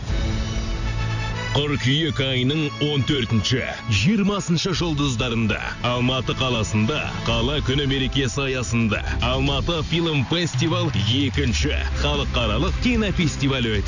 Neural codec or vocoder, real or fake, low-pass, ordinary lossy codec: none; real; 7.2 kHz; none